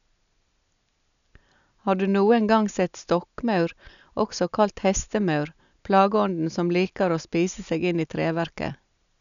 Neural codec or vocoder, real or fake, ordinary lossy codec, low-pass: none; real; none; 7.2 kHz